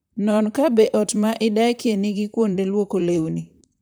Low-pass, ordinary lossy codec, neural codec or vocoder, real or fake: none; none; vocoder, 44.1 kHz, 128 mel bands, Pupu-Vocoder; fake